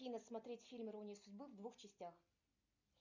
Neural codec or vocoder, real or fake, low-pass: none; real; 7.2 kHz